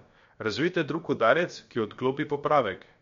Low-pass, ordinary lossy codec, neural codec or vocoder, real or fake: 7.2 kHz; MP3, 48 kbps; codec, 16 kHz, about 1 kbps, DyCAST, with the encoder's durations; fake